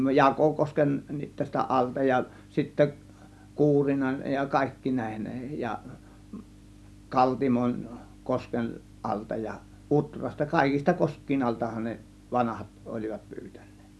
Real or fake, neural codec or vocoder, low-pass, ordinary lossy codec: real; none; none; none